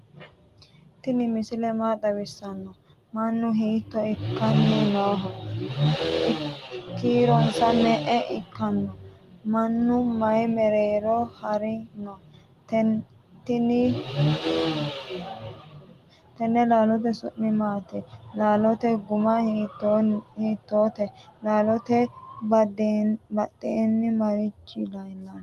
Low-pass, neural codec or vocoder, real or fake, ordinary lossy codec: 14.4 kHz; none; real; Opus, 24 kbps